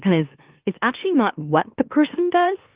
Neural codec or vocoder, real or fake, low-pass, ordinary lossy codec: autoencoder, 44.1 kHz, a latent of 192 numbers a frame, MeloTTS; fake; 3.6 kHz; Opus, 32 kbps